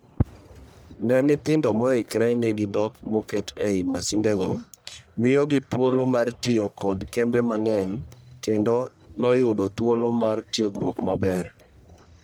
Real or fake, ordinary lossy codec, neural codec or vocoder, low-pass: fake; none; codec, 44.1 kHz, 1.7 kbps, Pupu-Codec; none